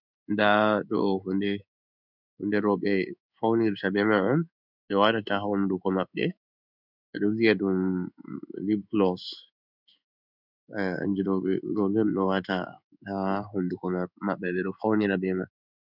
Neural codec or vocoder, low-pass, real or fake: codec, 16 kHz in and 24 kHz out, 1 kbps, XY-Tokenizer; 5.4 kHz; fake